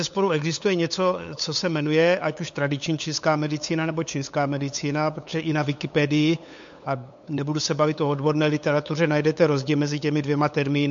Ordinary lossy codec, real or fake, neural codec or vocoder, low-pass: MP3, 48 kbps; fake; codec, 16 kHz, 8 kbps, FunCodec, trained on LibriTTS, 25 frames a second; 7.2 kHz